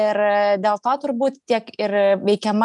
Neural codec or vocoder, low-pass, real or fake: none; 10.8 kHz; real